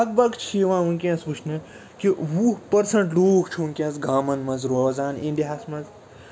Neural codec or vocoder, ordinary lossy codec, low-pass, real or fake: none; none; none; real